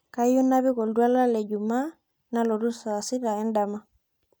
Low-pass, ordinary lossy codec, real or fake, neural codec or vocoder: none; none; real; none